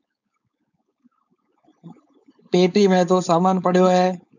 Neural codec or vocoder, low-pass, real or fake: codec, 16 kHz, 4.8 kbps, FACodec; 7.2 kHz; fake